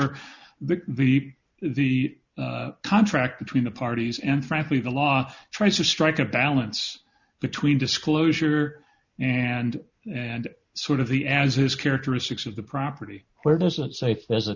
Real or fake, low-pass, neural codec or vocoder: real; 7.2 kHz; none